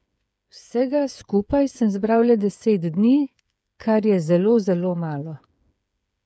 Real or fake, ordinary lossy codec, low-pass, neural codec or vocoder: fake; none; none; codec, 16 kHz, 8 kbps, FreqCodec, smaller model